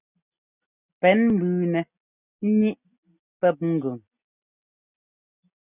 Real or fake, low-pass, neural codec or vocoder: real; 3.6 kHz; none